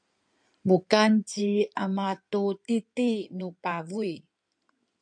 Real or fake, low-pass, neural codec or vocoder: fake; 9.9 kHz; codec, 16 kHz in and 24 kHz out, 2.2 kbps, FireRedTTS-2 codec